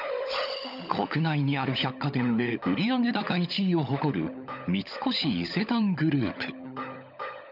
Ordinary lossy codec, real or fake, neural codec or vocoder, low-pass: none; fake; codec, 16 kHz, 16 kbps, FunCodec, trained on LibriTTS, 50 frames a second; 5.4 kHz